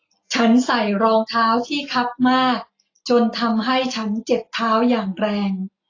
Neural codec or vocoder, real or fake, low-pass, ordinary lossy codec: none; real; 7.2 kHz; AAC, 32 kbps